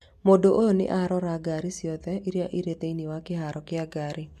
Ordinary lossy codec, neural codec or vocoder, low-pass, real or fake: MP3, 96 kbps; none; 14.4 kHz; real